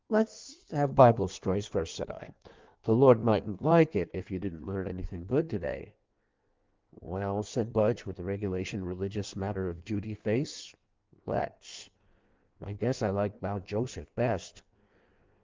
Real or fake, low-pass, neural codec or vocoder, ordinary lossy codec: fake; 7.2 kHz; codec, 16 kHz in and 24 kHz out, 1.1 kbps, FireRedTTS-2 codec; Opus, 32 kbps